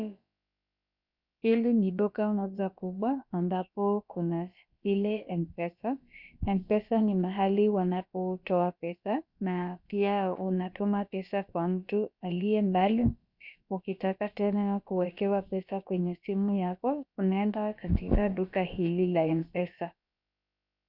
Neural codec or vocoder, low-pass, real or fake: codec, 16 kHz, about 1 kbps, DyCAST, with the encoder's durations; 5.4 kHz; fake